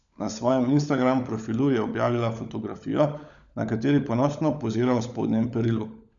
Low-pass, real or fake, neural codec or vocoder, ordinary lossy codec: 7.2 kHz; fake; codec, 16 kHz, 16 kbps, FunCodec, trained on LibriTTS, 50 frames a second; none